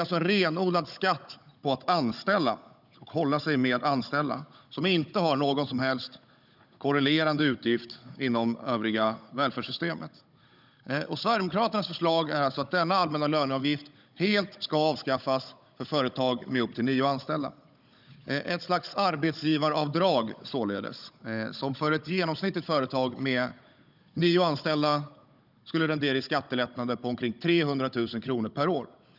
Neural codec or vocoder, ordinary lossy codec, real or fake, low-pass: codec, 16 kHz, 16 kbps, FunCodec, trained on Chinese and English, 50 frames a second; none; fake; 5.4 kHz